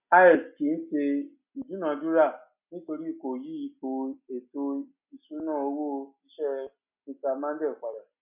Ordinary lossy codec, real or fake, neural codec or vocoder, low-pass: none; real; none; 3.6 kHz